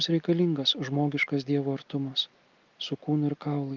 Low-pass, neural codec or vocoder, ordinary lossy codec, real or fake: 7.2 kHz; none; Opus, 24 kbps; real